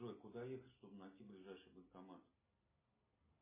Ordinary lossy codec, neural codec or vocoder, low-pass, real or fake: MP3, 16 kbps; none; 3.6 kHz; real